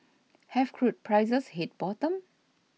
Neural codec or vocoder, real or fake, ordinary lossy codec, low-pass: none; real; none; none